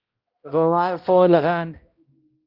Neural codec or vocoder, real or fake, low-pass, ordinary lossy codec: codec, 16 kHz, 0.5 kbps, X-Codec, HuBERT features, trained on balanced general audio; fake; 5.4 kHz; Opus, 32 kbps